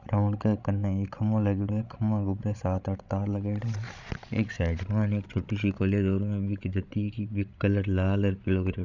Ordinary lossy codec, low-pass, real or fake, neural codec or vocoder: Opus, 64 kbps; 7.2 kHz; fake; codec, 16 kHz, 16 kbps, FreqCodec, larger model